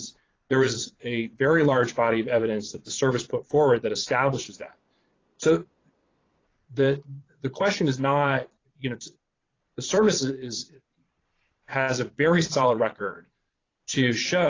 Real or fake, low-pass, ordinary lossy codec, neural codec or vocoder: fake; 7.2 kHz; AAC, 32 kbps; vocoder, 22.05 kHz, 80 mel bands, Vocos